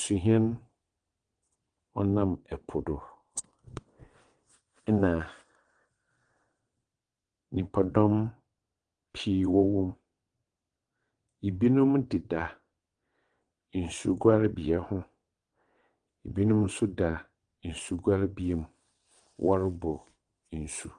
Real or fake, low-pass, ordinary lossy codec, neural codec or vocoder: fake; 9.9 kHz; Opus, 24 kbps; vocoder, 22.05 kHz, 80 mel bands, WaveNeXt